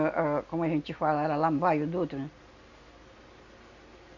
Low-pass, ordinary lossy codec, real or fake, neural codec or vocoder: 7.2 kHz; none; real; none